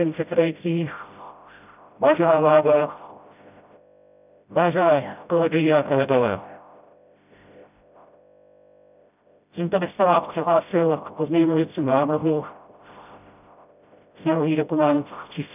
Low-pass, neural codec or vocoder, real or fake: 3.6 kHz; codec, 16 kHz, 0.5 kbps, FreqCodec, smaller model; fake